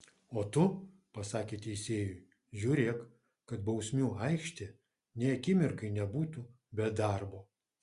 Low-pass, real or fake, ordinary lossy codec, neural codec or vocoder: 10.8 kHz; real; Opus, 64 kbps; none